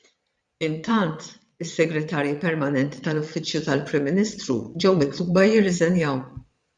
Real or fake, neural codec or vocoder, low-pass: fake; vocoder, 22.05 kHz, 80 mel bands, Vocos; 9.9 kHz